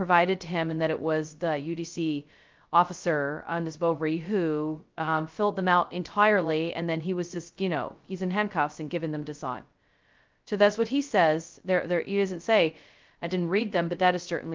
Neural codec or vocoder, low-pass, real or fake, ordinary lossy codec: codec, 16 kHz, 0.2 kbps, FocalCodec; 7.2 kHz; fake; Opus, 24 kbps